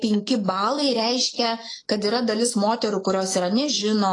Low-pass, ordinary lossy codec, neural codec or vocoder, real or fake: 10.8 kHz; AAC, 32 kbps; autoencoder, 48 kHz, 128 numbers a frame, DAC-VAE, trained on Japanese speech; fake